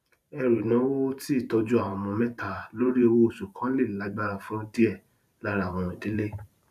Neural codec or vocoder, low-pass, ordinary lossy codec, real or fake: vocoder, 44.1 kHz, 128 mel bands every 512 samples, BigVGAN v2; 14.4 kHz; none; fake